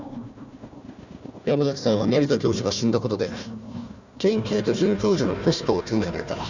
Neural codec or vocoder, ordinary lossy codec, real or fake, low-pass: codec, 16 kHz, 1 kbps, FunCodec, trained on Chinese and English, 50 frames a second; none; fake; 7.2 kHz